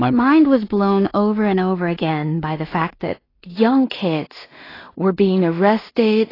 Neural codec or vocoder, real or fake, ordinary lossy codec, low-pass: codec, 16 kHz in and 24 kHz out, 0.4 kbps, LongCat-Audio-Codec, two codebook decoder; fake; AAC, 24 kbps; 5.4 kHz